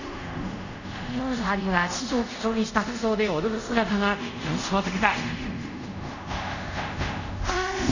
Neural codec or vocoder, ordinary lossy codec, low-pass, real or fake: codec, 24 kHz, 0.5 kbps, DualCodec; none; 7.2 kHz; fake